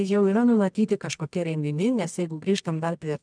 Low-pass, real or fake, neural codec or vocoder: 9.9 kHz; fake; codec, 24 kHz, 0.9 kbps, WavTokenizer, medium music audio release